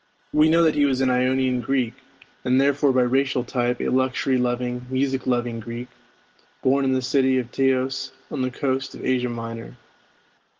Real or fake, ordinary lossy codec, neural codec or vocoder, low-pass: real; Opus, 16 kbps; none; 7.2 kHz